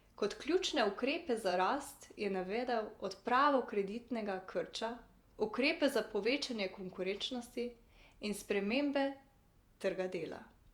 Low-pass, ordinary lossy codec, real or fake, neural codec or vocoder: 19.8 kHz; Opus, 64 kbps; fake; vocoder, 48 kHz, 128 mel bands, Vocos